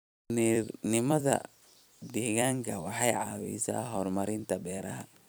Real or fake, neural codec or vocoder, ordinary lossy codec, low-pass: fake; vocoder, 44.1 kHz, 128 mel bands every 256 samples, BigVGAN v2; none; none